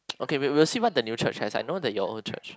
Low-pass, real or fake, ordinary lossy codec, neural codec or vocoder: none; real; none; none